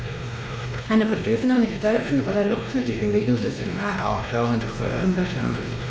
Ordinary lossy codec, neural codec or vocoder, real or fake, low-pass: none; codec, 16 kHz, 1 kbps, X-Codec, WavLM features, trained on Multilingual LibriSpeech; fake; none